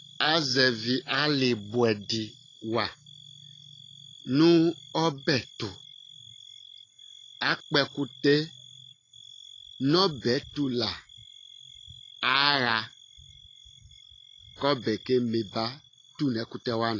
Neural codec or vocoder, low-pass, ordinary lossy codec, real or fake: none; 7.2 kHz; AAC, 32 kbps; real